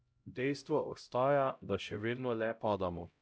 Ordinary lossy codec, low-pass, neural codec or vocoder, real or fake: none; none; codec, 16 kHz, 0.5 kbps, X-Codec, HuBERT features, trained on LibriSpeech; fake